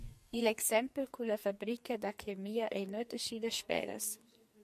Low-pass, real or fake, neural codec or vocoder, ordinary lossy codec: 14.4 kHz; fake; codec, 44.1 kHz, 2.6 kbps, SNAC; MP3, 64 kbps